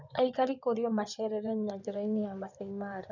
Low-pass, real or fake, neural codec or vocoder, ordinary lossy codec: 7.2 kHz; fake; codec, 16 kHz in and 24 kHz out, 2.2 kbps, FireRedTTS-2 codec; none